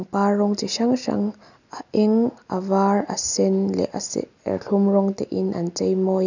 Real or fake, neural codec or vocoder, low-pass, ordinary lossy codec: real; none; 7.2 kHz; none